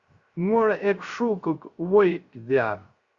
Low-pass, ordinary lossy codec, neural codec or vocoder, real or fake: 7.2 kHz; Opus, 64 kbps; codec, 16 kHz, 0.3 kbps, FocalCodec; fake